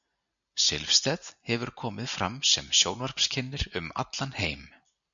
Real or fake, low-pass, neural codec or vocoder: real; 7.2 kHz; none